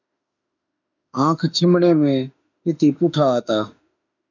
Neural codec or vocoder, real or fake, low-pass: autoencoder, 48 kHz, 32 numbers a frame, DAC-VAE, trained on Japanese speech; fake; 7.2 kHz